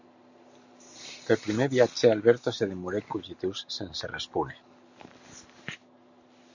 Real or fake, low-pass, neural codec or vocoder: real; 7.2 kHz; none